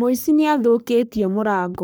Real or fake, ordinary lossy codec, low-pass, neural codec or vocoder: fake; none; none; codec, 44.1 kHz, 3.4 kbps, Pupu-Codec